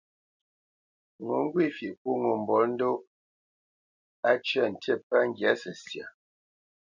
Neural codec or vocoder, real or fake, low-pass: none; real; 7.2 kHz